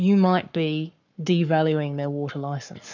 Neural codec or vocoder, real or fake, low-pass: codec, 44.1 kHz, 7.8 kbps, Pupu-Codec; fake; 7.2 kHz